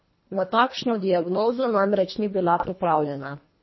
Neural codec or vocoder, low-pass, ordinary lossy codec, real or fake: codec, 24 kHz, 1.5 kbps, HILCodec; 7.2 kHz; MP3, 24 kbps; fake